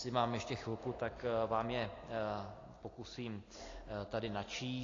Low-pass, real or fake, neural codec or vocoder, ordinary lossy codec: 7.2 kHz; real; none; AAC, 32 kbps